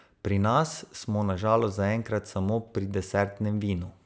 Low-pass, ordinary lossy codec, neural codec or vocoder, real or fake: none; none; none; real